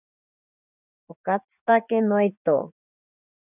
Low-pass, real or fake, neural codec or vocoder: 3.6 kHz; fake; vocoder, 24 kHz, 100 mel bands, Vocos